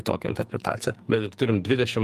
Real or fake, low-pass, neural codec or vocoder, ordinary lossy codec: fake; 14.4 kHz; codec, 44.1 kHz, 2.6 kbps, SNAC; Opus, 32 kbps